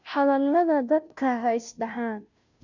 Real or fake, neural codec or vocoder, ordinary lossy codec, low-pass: fake; codec, 16 kHz, 0.5 kbps, FunCodec, trained on Chinese and English, 25 frames a second; none; 7.2 kHz